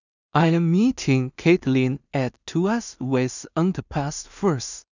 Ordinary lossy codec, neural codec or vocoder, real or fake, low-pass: none; codec, 16 kHz in and 24 kHz out, 0.4 kbps, LongCat-Audio-Codec, two codebook decoder; fake; 7.2 kHz